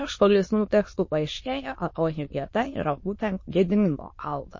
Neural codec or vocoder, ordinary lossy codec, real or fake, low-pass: autoencoder, 22.05 kHz, a latent of 192 numbers a frame, VITS, trained on many speakers; MP3, 32 kbps; fake; 7.2 kHz